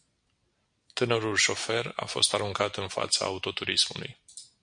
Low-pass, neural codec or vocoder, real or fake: 9.9 kHz; none; real